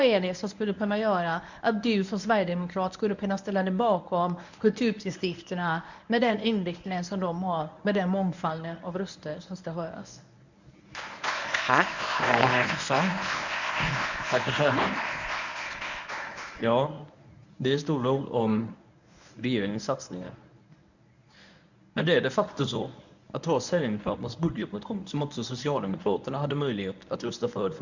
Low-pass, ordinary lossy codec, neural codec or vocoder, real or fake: 7.2 kHz; none; codec, 24 kHz, 0.9 kbps, WavTokenizer, medium speech release version 1; fake